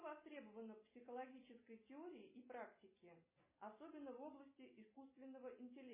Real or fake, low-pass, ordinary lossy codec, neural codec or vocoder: real; 3.6 kHz; MP3, 16 kbps; none